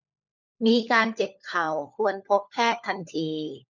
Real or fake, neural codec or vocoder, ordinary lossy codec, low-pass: fake; codec, 16 kHz, 4 kbps, FunCodec, trained on LibriTTS, 50 frames a second; none; 7.2 kHz